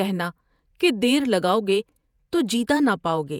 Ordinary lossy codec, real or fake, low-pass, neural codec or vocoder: none; fake; 19.8 kHz; vocoder, 48 kHz, 128 mel bands, Vocos